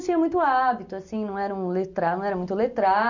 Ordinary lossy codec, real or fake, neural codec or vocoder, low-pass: none; real; none; 7.2 kHz